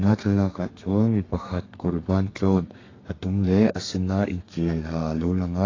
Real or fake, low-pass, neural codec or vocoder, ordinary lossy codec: fake; 7.2 kHz; codec, 32 kHz, 1.9 kbps, SNAC; AAC, 32 kbps